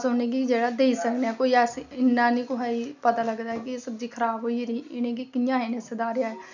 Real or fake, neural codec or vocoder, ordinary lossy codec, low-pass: real; none; none; 7.2 kHz